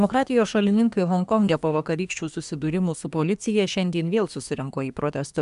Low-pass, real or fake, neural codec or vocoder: 10.8 kHz; fake; codec, 24 kHz, 1 kbps, SNAC